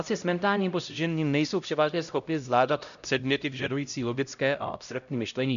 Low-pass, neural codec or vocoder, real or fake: 7.2 kHz; codec, 16 kHz, 0.5 kbps, X-Codec, HuBERT features, trained on LibriSpeech; fake